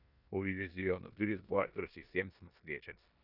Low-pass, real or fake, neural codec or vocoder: 5.4 kHz; fake; codec, 16 kHz in and 24 kHz out, 0.9 kbps, LongCat-Audio-Codec, four codebook decoder